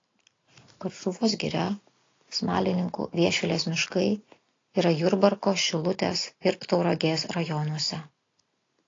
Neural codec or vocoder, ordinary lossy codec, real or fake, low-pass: none; AAC, 32 kbps; real; 7.2 kHz